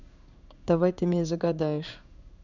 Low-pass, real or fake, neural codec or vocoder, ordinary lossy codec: 7.2 kHz; fake; codec, 16 kHz in and 24 kHz out, 1 kbps, XY-Tokenizer; none